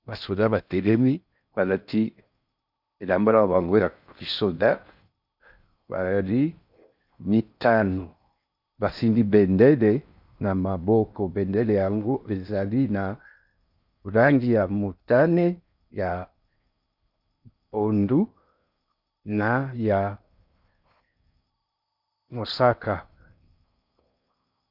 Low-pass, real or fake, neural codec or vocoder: 5.4 kHz; fake; codec, 16 kHz in and 24 kHz out, 0.6 kbps, FocalCodec, streaming, 4096 codes